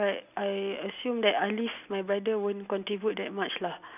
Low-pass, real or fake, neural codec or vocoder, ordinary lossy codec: 3.6 kHz; real; none; none